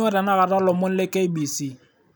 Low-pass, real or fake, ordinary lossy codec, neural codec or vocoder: none; real; none; none